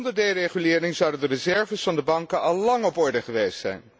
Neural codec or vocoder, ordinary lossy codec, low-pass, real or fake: none; none; none; real